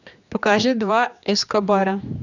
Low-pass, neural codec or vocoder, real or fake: 7.2 kHz; codec, 16 kHz, 2 kbps, X-Codec, HuBERT features, trained on general audio; fake